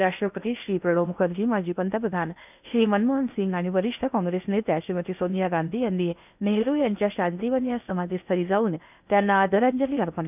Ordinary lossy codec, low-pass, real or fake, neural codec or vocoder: none; 3.6 kHz; fake; codec, 16 kHz in and 24 kHz out, 0.8 kbps, FocalCodec, streaming, 65536 codes